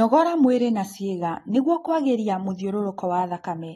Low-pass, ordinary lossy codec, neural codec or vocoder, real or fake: 19.8 kHz; AAC, 32 kbps; none; real